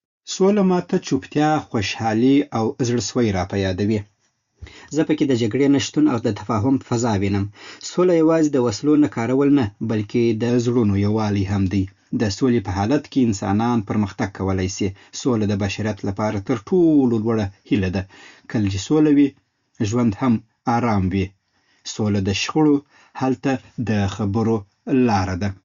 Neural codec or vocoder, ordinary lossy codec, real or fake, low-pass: none; Opus, 64 kbps; real; 7.2 kHz